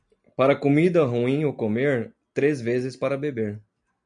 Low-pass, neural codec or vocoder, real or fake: 9.9 kHz; none; real